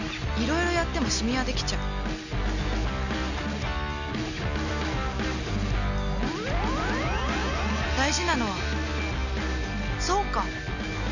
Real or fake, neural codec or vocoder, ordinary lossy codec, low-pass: real; none; none; 7.2 kHz